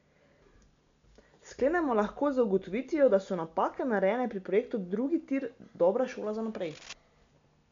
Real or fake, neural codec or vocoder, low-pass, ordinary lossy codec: real; none; 7.2 kHz; MP3, 64 kbps